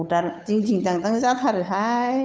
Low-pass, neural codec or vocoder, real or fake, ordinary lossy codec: none; codec, 16 kHz, 8 kbps, FunCodec, trained on Chinese and English, 25 frames a second; fake; none